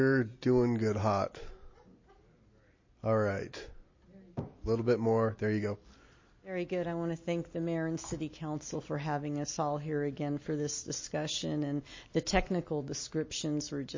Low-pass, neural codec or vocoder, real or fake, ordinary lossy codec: 7.2 kHz; none; real; MP3, 32 kbps